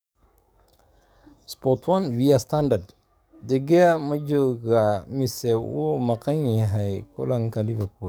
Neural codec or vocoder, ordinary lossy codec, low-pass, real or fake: codec, 44.1 kHz, 7.8 kbps, DAC; none; none; fake